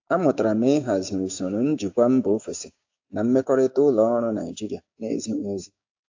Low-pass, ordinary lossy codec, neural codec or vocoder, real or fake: 7.2 kHz; AAC, 48 kbps; codec, 16 kHz, 6 kbps, DAC; fake